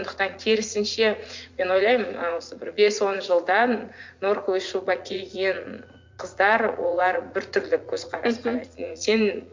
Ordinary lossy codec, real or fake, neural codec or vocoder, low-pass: MP3, 64 kbps; fake; vocoder, 44.1 kHz, 80 mel bands, Vocos; 7.2 kHz